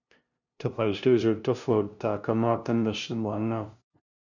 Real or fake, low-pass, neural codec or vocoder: fake; 7.2 kHz; codec, 16 kHz, 0.5 kbps, FunCodec, trained on LibriTTS, 25 frames a second